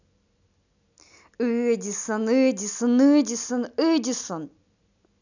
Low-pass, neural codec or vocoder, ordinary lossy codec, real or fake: 7.2 kHz; none; none; real